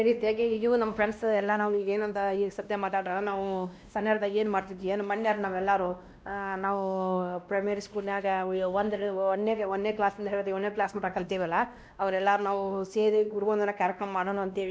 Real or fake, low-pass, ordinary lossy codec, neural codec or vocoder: fake; none; none; codec, 16 kHz, 1 kbps, X-Codec, WavLM features, trained on Multilingual LibriSpeech